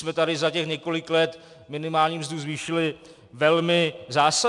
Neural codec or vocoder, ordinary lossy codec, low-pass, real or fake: none; MP3, 96 kbps; 10.8 kHz; real